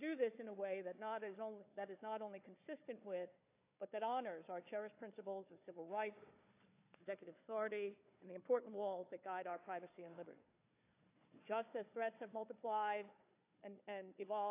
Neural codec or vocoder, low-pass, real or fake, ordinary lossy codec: codec, 16 kHz, 4 kbps, FunCodec, trained on Chinese and English, 50 frames a second; 3.6 kHz; fake; AAC, 24 kbps